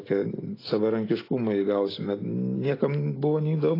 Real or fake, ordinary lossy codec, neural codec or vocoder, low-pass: real; AAC, 24 kbps; none; 5.4 kHz